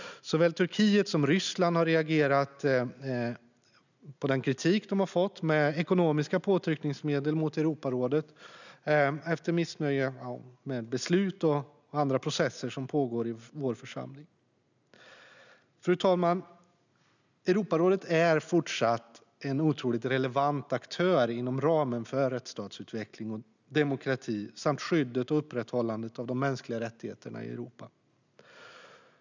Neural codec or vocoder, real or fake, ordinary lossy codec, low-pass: none; real; none; 7.2 kHz